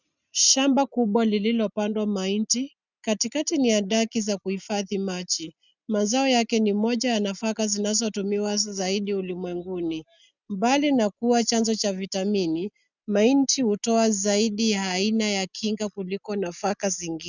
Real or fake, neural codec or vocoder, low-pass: real; none; 7.2 kHz